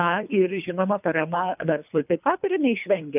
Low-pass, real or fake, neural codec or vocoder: 3.6 kHz; fake; codec, 24 kHz, 3 kbps, HILCodec